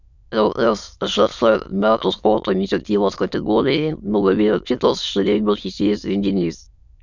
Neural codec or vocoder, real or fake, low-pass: autoencoder, 22.05 kHz, a latent of 192 numbers a frame, VITS, trained on many speakers; fake; 7.2 kHz